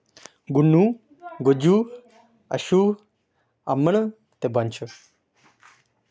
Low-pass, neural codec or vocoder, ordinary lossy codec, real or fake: none; none; none; real